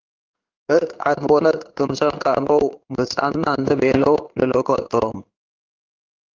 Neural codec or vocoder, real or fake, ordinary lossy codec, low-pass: codec, 16 kHz in and 24 kHz out, 1 kbps, XY-Tokenizer; fake; Opus, 24 kbps; 7.2 kHz